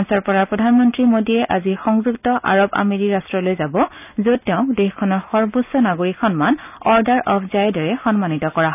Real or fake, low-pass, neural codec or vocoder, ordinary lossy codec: real; 3.6 kHz; none; none